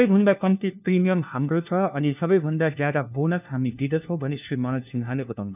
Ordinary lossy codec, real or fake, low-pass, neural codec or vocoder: none; fake; 3.6 kHz; codec, 16 kHz, 1 kbps, FunCodec, trained on LibriTTS, 50 frames a second